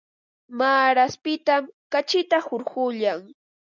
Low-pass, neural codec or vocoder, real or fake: 7.2 kHz; none; real